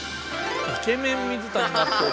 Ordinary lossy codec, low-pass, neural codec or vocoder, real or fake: none; none; none; real